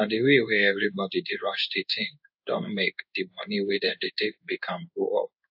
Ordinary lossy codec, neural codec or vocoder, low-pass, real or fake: AAC, 48 kbps; codec, 16 kHz in and 24 kHz out, 1 kbps, XY-Tokenizer; 5.4 kHz; fake